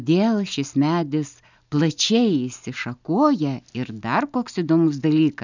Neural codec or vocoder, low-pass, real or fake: none; 7.2 kHz; real